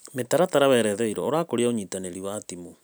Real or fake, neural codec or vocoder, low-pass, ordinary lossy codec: real; none; none; none